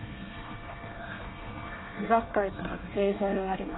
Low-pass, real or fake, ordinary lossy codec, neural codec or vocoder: 7.2 kHz; fake; AAC, 16 kbps; codec, 24 kHz, 1 kbps, SNAC